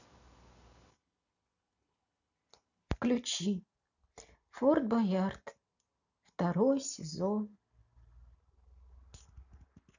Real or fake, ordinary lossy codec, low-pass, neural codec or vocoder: real; none; 7.2 kHz; none